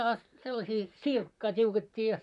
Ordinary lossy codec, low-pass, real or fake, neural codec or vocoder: none; 10.8 kHz; fake; vocoder, 44.1 kHz, 128 mel bands, Pupu-Vocoder